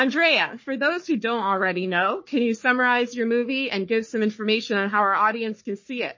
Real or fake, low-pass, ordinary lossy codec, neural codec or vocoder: fake; 7.2 kHz; MP3, 32 kbps; codec, 44.1 kHz, 3.4 kbps, Pupu-Codec